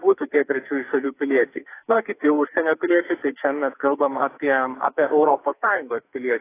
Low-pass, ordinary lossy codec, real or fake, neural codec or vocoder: 3.6 kHz; AAC, 24 kbps; fake; codec, 44.1 kHz, 2.6 kbps, SNAC